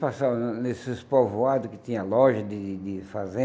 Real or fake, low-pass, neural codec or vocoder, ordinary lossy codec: real; none; none; none